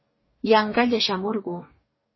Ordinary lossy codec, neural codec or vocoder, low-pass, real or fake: MP3, 24 kbps; codec, 44.1 kHz, 2.6 kbps, DAC; 7.2 kHz; fake